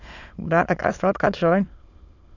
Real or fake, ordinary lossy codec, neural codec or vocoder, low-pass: fake; none; autoencoder, 22.05 kHz, a latent of 192 numbers a frame, VITS, trained on many speakers; 7.2 kHz